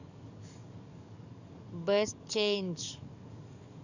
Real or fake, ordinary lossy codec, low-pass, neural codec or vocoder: fake; none; 7.2 kHz; codec, 44.1 kHz, 7.8 kbps, DAC